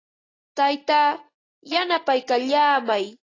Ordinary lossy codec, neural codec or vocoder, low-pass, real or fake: AAC, 32 kbps; none; 7.2 kHz; real